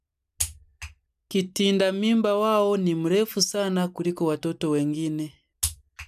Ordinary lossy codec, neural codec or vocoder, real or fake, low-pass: none; none; real; 14.4 kHz